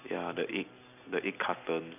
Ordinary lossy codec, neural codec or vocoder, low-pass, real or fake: none; none; 3.6 kHz; real